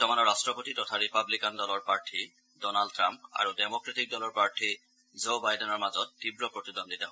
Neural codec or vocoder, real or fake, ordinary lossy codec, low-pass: none; real; none; none